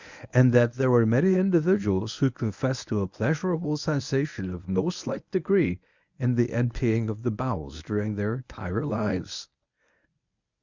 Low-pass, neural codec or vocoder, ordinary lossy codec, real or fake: 7.2 kHz; codec, 24 kHz, 0.9 kbps, WavTokenizer, medium speech release version 1; Opus, 64 kbps; fake